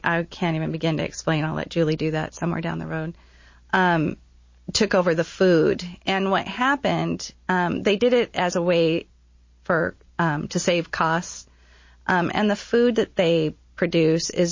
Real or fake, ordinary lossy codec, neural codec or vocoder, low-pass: real; MP3, 32 kbps; none; 7.2 kHz